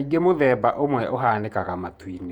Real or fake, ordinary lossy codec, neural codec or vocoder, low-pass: fake; Opus, 64 kbps; vocoder, 48 kHz, 128 mel bands, Vocos; 19.8 kHz